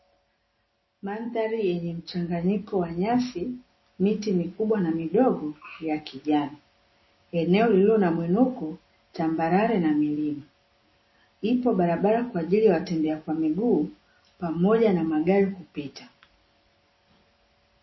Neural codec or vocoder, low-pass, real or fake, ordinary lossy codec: none; 7.2 kHz; real; MP3, 24 kbps